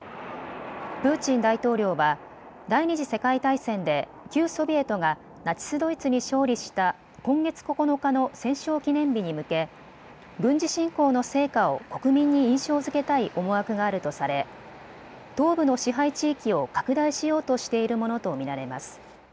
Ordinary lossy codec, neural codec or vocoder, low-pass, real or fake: none; none; none; real